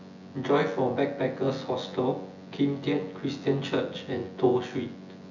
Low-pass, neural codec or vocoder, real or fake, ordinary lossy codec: 7.2 kHz; vocoder, 24 kHz, 100 mel bands, Vocos; fake; none